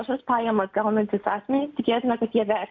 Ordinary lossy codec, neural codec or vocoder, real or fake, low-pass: AAC, 48 kbps; none; real; 7.2 kHz